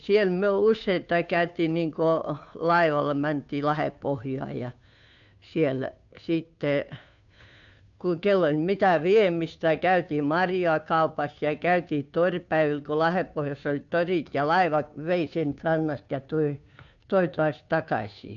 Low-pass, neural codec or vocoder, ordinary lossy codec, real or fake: 7.2 kHz; codec, 16 kHz, 2 kbps, FunCodec, trained on Chinese and English, 25 frames a second; none; fake